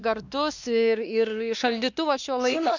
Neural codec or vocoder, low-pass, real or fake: codec, 16 kHz, 2 kbps, X-Codec, WavLM features, trained on Multilingual LibriSpeech; 7.2 kHz; fake